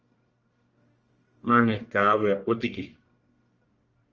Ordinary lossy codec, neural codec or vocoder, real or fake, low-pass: Opus, 24 kbps; codec, 44.1 kHz, 1.7 kbps, Pupu-Codec; fake; 7.2 kHz